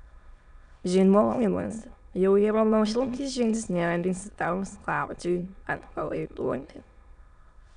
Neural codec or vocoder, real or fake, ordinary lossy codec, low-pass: autoencoder, 22.05 kHz, a latent of 192 numbers a frame, VITS, trained on many speakers; fake; MP3, 96 kbps; 9.9 kHz